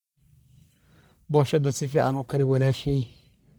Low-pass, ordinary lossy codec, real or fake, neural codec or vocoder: none; none; fake; codec, 44.1 kHz, 1.7 kbps, Pupu-Codec